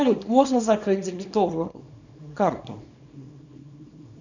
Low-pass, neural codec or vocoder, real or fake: 7.2 kHz; codec, 24 kHz, 0.9 kbps, WavTokenizer, small release; fake